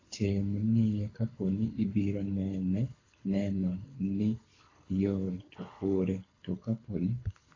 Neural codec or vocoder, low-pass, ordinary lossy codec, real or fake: codec, 24 kHz, 6 kbps, HILCodec; 7.2 kHz; AAC, 32 kbps; fake